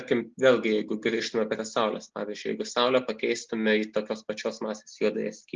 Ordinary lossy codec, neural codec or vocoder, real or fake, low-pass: Opus, 24 kbps; none; real; 7.2 kHz